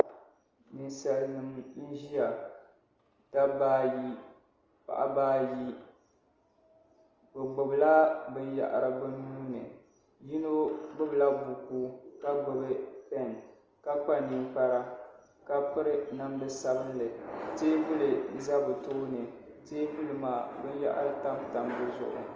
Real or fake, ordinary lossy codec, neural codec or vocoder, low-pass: real; Opus, 24 kbps; none; 7.2 kHz